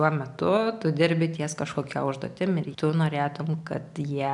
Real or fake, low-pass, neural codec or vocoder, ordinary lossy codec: real; 10.8 kHz; none; MP3, 96 kbps